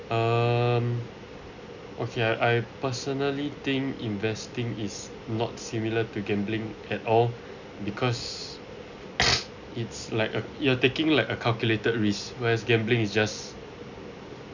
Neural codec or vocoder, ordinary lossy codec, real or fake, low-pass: none; none; real; 7.2 kHz